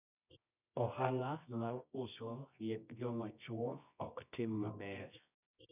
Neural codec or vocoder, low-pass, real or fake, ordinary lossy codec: codec, 24 kHz, 0.9 kbps, WavTokenizer, medium music audio release; 3.6 kHz; fake; none